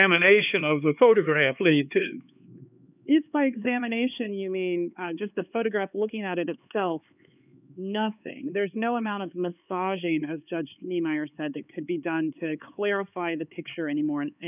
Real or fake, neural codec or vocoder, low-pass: fake; codec, 16 kHz, 4 kbps, X-Codec, HuBERT features, trained on LibriSpeech; 3.6 kHz